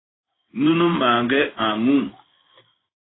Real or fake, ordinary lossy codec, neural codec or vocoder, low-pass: fake; AAC, 16 kbps; codec, 16 kHz in and 24 kHz out, 1 kbps, XY-Tokenizer; 7.2 kHz